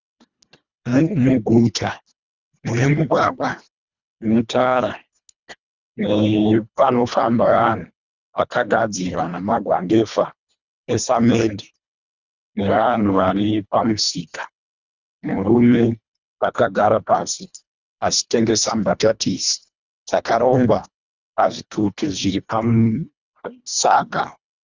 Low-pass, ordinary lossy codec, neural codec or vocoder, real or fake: 7.2 kHz; Opus, 64 kbps; codec, 24 kHz, 1.5 kbps, HILCodec; fake